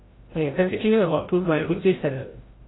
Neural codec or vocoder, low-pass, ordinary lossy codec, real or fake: codec, 16 kHz, 0.5 kbps, FreqCodec, larger model; 7.2 kHz; AAC, 16 kbps; fake